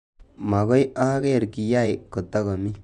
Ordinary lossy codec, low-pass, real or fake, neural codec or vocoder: none; 10.8 kHz; fake; vocoder, 24 kHz, 100 mel bands, Vocos